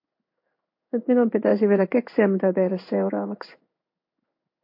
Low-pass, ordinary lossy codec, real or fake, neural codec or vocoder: 5.4 kHz; MP3, 32 kbps; fake; codec, 16 kHz in and 24 kHz out, 1 kbps, XY-Tokenizer